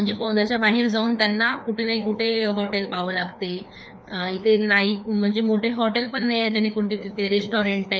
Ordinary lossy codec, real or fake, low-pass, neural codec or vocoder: none; fake; none; codec, 16 kHz, 2 kbps, FreqCodec, larger model